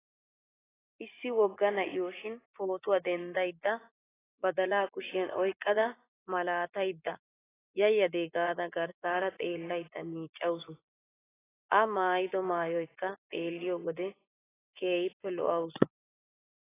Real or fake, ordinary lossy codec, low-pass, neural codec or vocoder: fake; AAC, 16 kbps; 3.6 kHz; vocoder, 24 kHz, 100 mel bands, Vocos